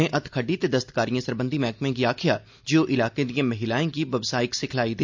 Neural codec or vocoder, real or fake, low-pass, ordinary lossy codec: none; real; 7.2 kHz; MP3, 64 kbps